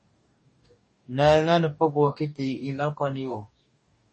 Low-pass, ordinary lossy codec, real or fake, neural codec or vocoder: 10.8 kHz; MP3, 32 kbps; fake; codec, 44.1 kHz, 2.6 kbps, DAC